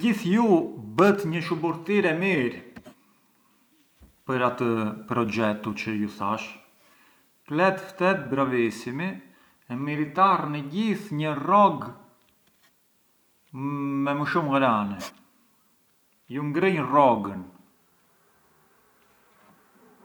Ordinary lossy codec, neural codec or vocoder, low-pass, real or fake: none; none; none; real